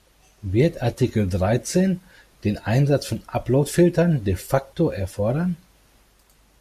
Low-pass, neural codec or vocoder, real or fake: 14.4 kHz; none; real